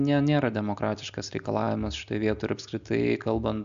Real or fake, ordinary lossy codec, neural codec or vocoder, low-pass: real; AAC, 96 kbps; none; 7.2 kHz